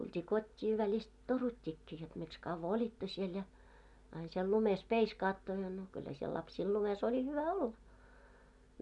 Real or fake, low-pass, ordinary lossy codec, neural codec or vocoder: real; 10.8 kHz; none; none